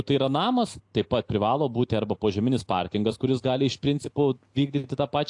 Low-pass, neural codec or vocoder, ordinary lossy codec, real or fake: 10.8 kHz; none; AAC, 64 kbps; real